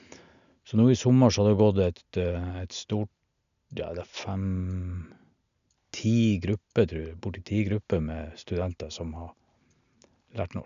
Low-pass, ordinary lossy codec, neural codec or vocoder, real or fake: 7.2 kHz; none; none; real